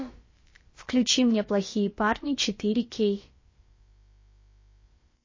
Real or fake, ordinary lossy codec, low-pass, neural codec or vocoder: fake; MP3, 32 kbps; 7.2 kHz; codec, 16 kHz, about 1 kbps, DyCAST, with the encoder's durations